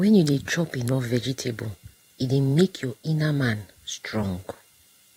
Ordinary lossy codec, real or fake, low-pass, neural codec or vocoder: AAC, 48 kbps; fake; 19.8 kHz; vocoder, 44.1 kHz, 128 mel bands every 512 samples, BigVGAN v2